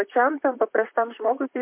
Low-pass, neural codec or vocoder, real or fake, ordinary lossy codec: 3.6 kHz; vocoder, 44.1 kHz, 128 mel bands, Pupu-Vocoder; fake; MP3, 32 kbps